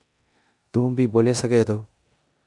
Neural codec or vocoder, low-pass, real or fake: codec, 16 kHz in and 24 kHz out, 0.9 kbps, LongCat-Audio-Codec, four codebook decoder; 10.8 kHz; fake